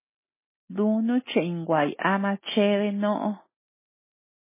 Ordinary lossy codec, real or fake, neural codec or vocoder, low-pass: MP3, 16 kbps; real; none; 3.6 kHz